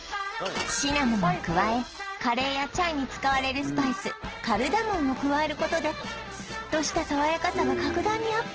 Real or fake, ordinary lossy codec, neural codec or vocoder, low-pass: real; Opus, 16 kbps; none; 7.2 kHz